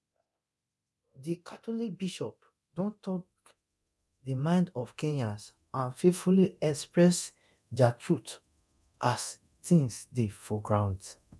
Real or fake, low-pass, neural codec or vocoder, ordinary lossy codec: fake; none; codec, 24 kHz, 0.9 kbps, DualCodec; none